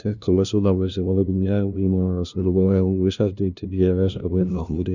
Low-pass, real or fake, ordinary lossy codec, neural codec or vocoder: 7.2 kHz; fake; none; codec, 16 kHz, 0.5 kbps, FunCodec, trained on LibriTTS, 25 frames a second